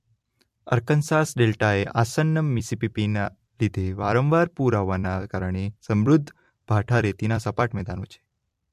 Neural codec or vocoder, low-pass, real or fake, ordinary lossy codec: vocoder, 44.1 kHz, 128 mel bands every 256 samples, BigVGAN v2; 14.4 kHz; fake; MP3, 64 kbps